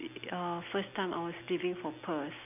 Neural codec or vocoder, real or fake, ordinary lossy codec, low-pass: none; real; none; 3.6 kHz